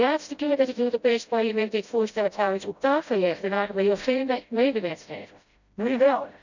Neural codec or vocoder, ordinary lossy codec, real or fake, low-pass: codec, 16 kHz, 0.5 kbps, FreqCodec, smaller model; none; fake; 7.2 kHz